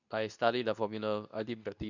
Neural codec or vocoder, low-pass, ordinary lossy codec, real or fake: codec, 24 kHz, 0.9 kbps, WavTokenizer, medium speech release version 2; 7.2 kHz; none; fake